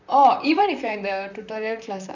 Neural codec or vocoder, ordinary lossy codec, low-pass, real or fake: vocoder, 44.1 kHz, 128 mel bands, Pupu-Vocoder; none; 7.2 kHz; fake